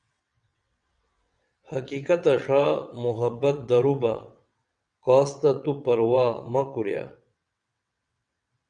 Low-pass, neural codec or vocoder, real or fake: 9.9 kHz; vocoder, 22.05 kHz, 80 mel bands, WaveNeXt; fake